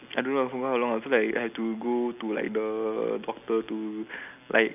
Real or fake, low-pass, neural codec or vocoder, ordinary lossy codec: real; 3.6 kHz; none; none